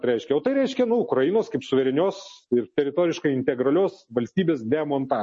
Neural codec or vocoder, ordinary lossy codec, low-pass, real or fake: none; MP3, 32 kbps; 10.8 kHz; real